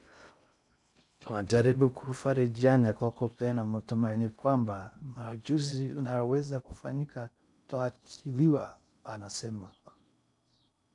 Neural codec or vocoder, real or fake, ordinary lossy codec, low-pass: codec, 16 kHz in and 24 kHz out, 0.6 kbps, FocalCodec, streaming, 2048 codes; fake; none; 10.8 kHz